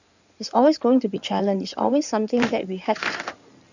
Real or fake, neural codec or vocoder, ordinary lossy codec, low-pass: fake; codec, 16 kHz in and 24 kHz out, 2.2 kbps, FireRedTTS-2 codec; none; 7.2 kHz